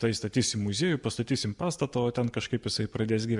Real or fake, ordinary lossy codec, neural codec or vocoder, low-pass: real; MP3, 96 kbps; none; 10.8 kHz